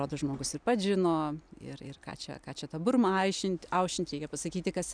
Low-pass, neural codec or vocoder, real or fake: 9.9 kHz; none; real